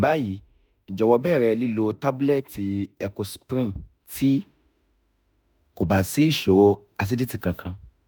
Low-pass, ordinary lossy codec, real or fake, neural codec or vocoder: none; none; fake; autoencoder, 48 kHz, 32 numbers a frame, DAC-VAE, trained on Japanese speech